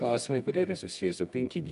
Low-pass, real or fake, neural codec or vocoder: 10.8 kHz; fake; codec, 24 kHz, 0.9 kbps, WavTokenizer, medium music audio release